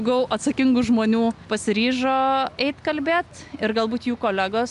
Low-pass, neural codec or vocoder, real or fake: 10.8 kHz; none; real